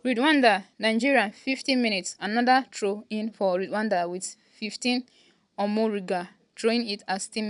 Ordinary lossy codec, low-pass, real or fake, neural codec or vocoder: none; 10.8 kHz; real; none